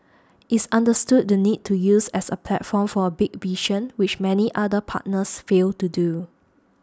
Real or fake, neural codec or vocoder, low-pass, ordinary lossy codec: real; none; none; none